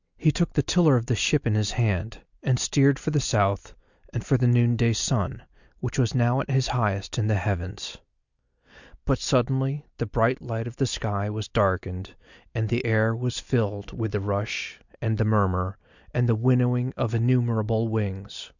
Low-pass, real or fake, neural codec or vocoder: 7.2 kHz; real; none